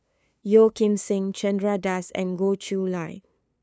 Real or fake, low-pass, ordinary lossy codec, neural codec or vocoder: fake; none; none; codec, 16 kHz, 2 kbps, FunCodec, trained on LibriTTS, 25 frames a second